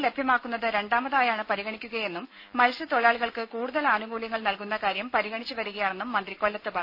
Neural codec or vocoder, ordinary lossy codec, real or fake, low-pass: none; none; real; 5.4 kHz